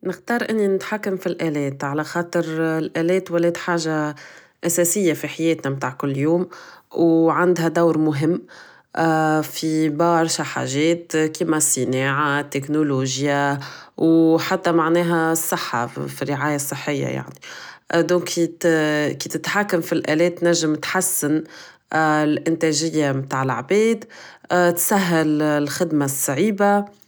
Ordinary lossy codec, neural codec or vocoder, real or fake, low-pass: none; none; real; none